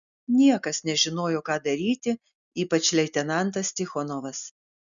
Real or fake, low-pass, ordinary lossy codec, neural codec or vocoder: real; 7.2 kHz; MP3, 96 kbps; none